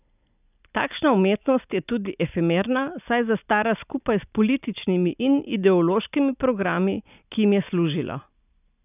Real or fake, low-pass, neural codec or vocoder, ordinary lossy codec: real; 3.6 kHz; none; none